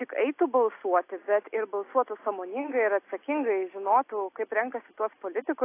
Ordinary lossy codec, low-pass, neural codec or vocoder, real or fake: AAC, 24 kbps; 3.6 kHz; none; real